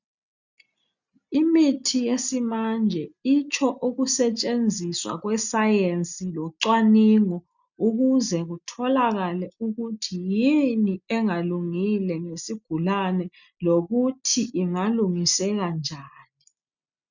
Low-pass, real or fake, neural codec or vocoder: 7.2 kHz; real; none